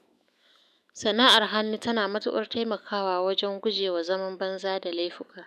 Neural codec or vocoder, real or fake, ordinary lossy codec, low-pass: autoencoder, 48 kHz, 128 numbers a frame, DAC-VAE, trained on Japanese speech; fake; none; 14.4 kHz